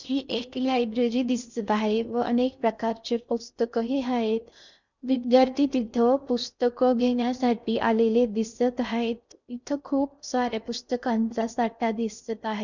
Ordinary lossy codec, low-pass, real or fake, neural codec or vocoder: none; 7.2 kHz; fake; codec, 16 kHz in and 24 kHz out, 0.6 kbps, FocalCodec, streaming, 4096 codes